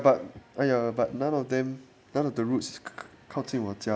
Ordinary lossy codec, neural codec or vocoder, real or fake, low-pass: none; none; real; none